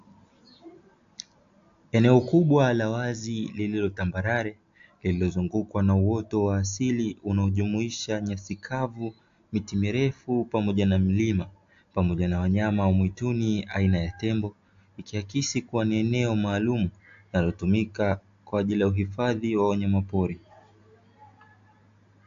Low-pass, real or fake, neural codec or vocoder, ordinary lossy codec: 7.2 kHz; real; none; AAC, 64 kbps